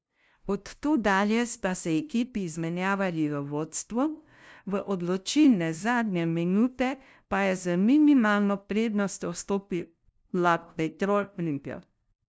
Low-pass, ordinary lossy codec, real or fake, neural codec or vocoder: none; none; fake; codec, 16 kHz, 0.5 kbps, FunCodec, trained on LibriTTS, 25 frames a second